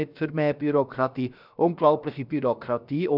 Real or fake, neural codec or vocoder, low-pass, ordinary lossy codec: fake; codec, 16 kHz, about 1 kbps, DyCAST, with the encoder's durations; 5.4 kHz; none